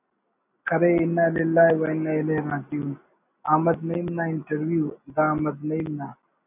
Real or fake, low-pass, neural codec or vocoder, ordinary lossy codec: real; 3.6 kHz; none; AAC, 32 kbps